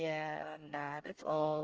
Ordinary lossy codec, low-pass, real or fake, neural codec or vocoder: Opus, 24 kbps; 7.2 kHz; fake; codec, 24 kHz, 1 kbps, SNAC